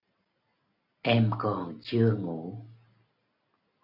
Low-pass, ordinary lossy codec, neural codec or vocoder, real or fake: 5.4 kHz; MP3, 48 kbps; none; real